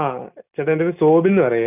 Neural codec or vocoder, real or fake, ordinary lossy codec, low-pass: none; real; none; 3.6 kHz